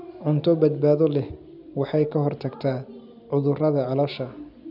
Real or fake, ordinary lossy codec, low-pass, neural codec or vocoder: real; none; 5.4 kHz; none